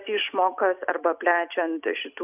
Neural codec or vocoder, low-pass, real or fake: none; 3.6 kHz; real